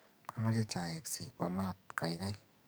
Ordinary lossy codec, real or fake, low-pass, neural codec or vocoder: none; fake; none; codec, 44.1 kHz, 2.6 kbps, SNAC